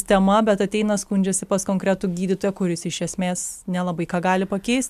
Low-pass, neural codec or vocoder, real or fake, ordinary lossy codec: 14.4 kHz; none; real; MP3, 96 kbps